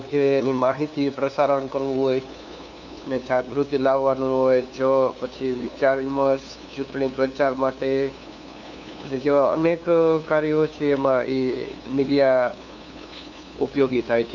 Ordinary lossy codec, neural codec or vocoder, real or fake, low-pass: none; codec, 16 kHz, 2 kbps, FunCodec, trained on LibriTTS, 25 frames a second; fake; 7.2 kHz